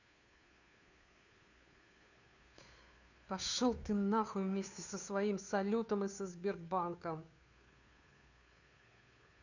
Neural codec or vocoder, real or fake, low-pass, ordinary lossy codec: codec, 16 kHz, 2 kbps, FunCodec, trained on Chinese and English, 25 frames a second; fake; 7.2 kHz; none